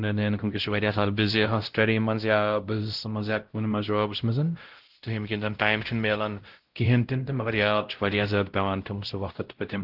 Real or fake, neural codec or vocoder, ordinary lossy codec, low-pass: fake; codec, 16 kHz, 0.5 kbps, X-Codec, WavLM features, trained on Multilingual LibriSpeech; Opus, 16 kbps; 5.4 kHz